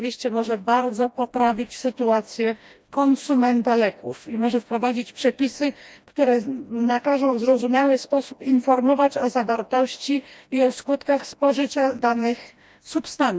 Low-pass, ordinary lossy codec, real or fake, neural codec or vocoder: none; none; fake; codec, 16 kHz, 1 kbps, FreqCodec, smaller model